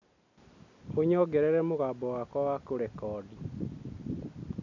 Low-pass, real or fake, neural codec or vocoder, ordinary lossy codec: 7.2 kHz; real; none; none